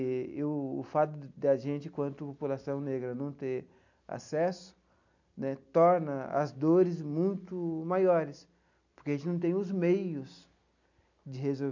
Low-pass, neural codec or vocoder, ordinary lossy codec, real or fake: 7.2 kHz; none; none; real